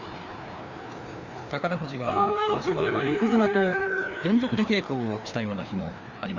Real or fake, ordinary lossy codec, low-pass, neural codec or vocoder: fake; none; 7.2 kHz; codec, 16 kHz, 2 kbps, FreqCodec, larger model